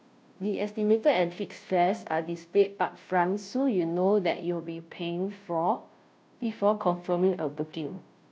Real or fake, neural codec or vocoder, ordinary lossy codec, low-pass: fake; codec, 16 kHz, 0.5 kbps, FunCodec, trained on Chinese and English, 25 frames a second; none; none